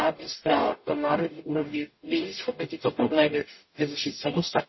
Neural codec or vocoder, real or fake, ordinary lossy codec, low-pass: codec, 44.1 kHz, 0.9 kbps, DAC; fake; MP3, 24 kbps; 7.2 kHz